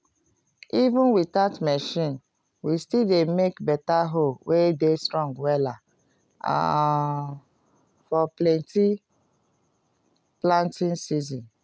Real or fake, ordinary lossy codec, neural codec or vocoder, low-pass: real; none; none; none